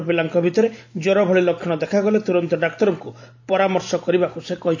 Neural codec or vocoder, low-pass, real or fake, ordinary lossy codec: none; 7.2 kHz; real; AAC, 48 kbps